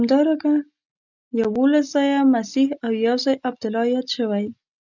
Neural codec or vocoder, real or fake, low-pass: none; real; 7.2 kHz